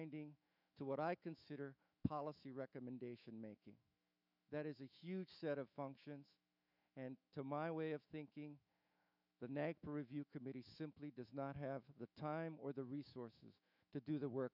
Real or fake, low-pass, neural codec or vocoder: fake; 5.4 kHz; autoencoder, 48 kHz, 128 numbers a frame, DAC-VAE, trained on Japanese speech